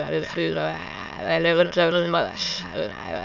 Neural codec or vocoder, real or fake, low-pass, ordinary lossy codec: autoencoder, 22.05 kHz, a latent of 192 numbers a frame, VITS, trained on many speakers; fake; 7.2 kHz; none